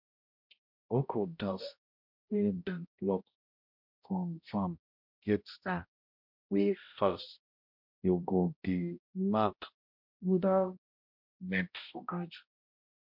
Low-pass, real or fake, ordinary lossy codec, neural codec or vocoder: 5.4 kHz; fake; MP3, 48 kbps; codec, 16 kHz, 0.5 kbps, X-Codec, HuBERT features, trained on balanced general audio